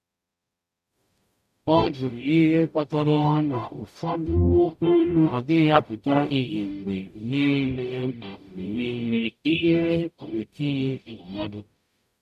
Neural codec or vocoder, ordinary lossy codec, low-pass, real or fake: codec, 44.1 kHz, 0.9 kbps, DAC; none; 14.4 kHz; fake